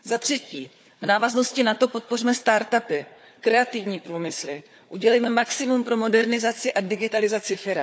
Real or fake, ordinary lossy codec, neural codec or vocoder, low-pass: fake; none; codec, 16 kHz, 4 kbps, FunCodec, trained on Chinese and English, 50 frames a second; none